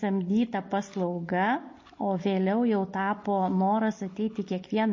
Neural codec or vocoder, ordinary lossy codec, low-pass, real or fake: none; MP3, 32 kbps; 7.2 kHz; real